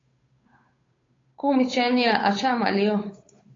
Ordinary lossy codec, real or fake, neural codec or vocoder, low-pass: AAC, 32 kbps; fake; codec, 16 kHz, 8 kbps, FunCodec, trained on Chinese and English, 25 frames a second; 7.2 kHz